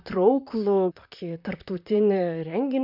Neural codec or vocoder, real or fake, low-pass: vocoder, 44.1 kHz, 128 mel bands, Pupu-Vocoder; fake; 5.4 kHz